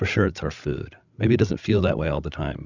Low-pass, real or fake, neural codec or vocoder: 7.2 kHz; fake; codec, 16 kHz, 16 kbps, FreqCodec, larger model